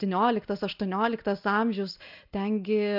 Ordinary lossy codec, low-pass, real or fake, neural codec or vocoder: AAC, 48 kbps; 5.4 kHz; real; none